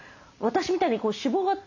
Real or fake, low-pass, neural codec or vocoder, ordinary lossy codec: real; 7.2 kHz; none; none